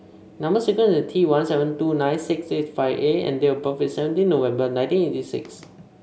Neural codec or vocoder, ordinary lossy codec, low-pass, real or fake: none; none; none; real